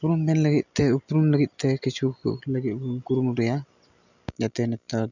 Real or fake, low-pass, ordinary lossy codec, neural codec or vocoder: real; 7.2 kHz; none; none